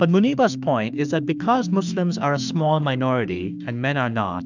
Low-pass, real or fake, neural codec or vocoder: 7.2 kHz; fake; autoencoder, 48 kHz, 32 numbers a frame, DAC-VAE, trained on Japanese speech